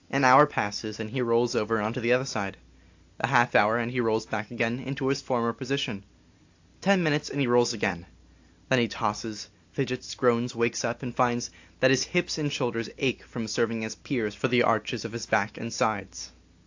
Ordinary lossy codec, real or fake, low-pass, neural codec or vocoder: AAC, 48 kbps; real; 7.2 kHz; none